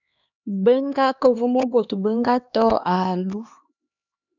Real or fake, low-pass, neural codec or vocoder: fake; 7.2 kHz; codec, 16 kHz, 2 kbps, X-Codec, HuBERT features, trained on LibriSpeech